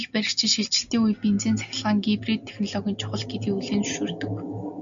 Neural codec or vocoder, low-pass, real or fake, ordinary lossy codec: none; 7.2 kHz; real; MP3, 64 kbps